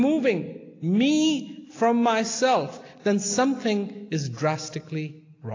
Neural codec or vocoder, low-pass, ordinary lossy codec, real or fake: none; 7.2 kHz; AAC, 32 kbps; real